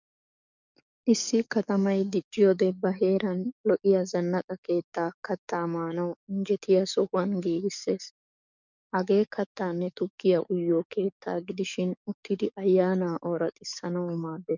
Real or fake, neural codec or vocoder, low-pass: fake; codec, 44.1 kHz, 7.8 kbps, DAC; 7.2 kHz